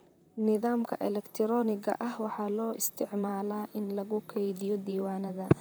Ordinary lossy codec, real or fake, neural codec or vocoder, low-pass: none; fake; vocoder, 44.1 kHz, 128 mel bands, Pupu-Vocoder; none